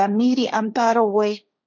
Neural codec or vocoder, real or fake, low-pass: codec, 16 kHz, 1.1 kbps, Voila-Tokenizer; fake; 7.2 kHz